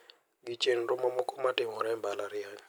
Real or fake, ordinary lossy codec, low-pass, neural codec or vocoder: real; none; none; none